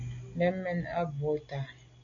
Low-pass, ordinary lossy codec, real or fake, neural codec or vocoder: 7.2 kHz; AAC, 64 kbps; real; none